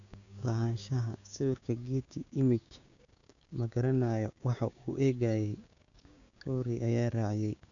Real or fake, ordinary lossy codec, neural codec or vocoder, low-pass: fake; none; codec, 16 kHz, 6 kbps, DAC; 7.2 kHz